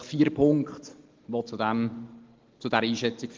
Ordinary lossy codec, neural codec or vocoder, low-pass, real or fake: Opus, 16 kbps; none; 7.2 kHz; real